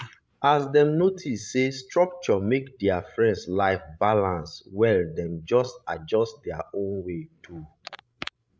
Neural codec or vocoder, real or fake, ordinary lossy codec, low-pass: codec, 16 kHz, 16 kbps, FreqCodec, larger model; fake; none; none